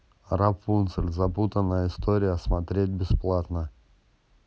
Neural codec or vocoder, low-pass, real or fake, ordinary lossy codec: none; none; real; none